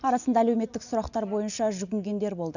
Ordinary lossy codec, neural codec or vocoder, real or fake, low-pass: none; none; real; 7.2 kHz